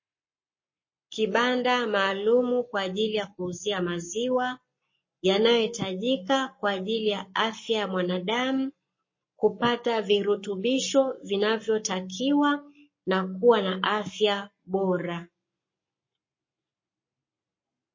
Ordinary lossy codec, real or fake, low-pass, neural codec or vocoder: MP3, 32 kbps; fake; 7.2 kHz; codec, 44.1 kHz, 7.8 kbps, Pupu-Codec